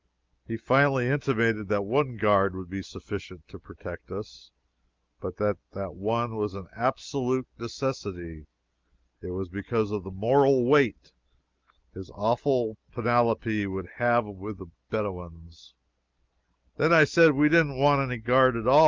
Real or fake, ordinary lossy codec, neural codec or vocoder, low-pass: real; Opus, 24 kbps; none; 7.2 kHz